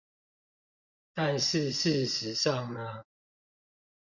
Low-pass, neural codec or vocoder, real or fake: 7.2 kHz; vocoder, 24 kHz, 100 mel bands, Vocos; fake